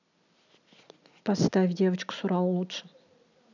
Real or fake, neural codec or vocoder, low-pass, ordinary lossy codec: real; none; 7.2 kHz; none